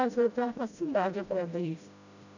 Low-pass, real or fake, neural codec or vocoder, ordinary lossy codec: 7.2 kHz; fake; codec, 16 kHz, 0.5 kbps, FreqCodec, smaller model; none